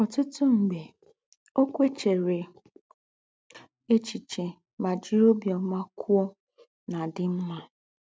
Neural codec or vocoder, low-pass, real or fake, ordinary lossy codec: none; none; real; none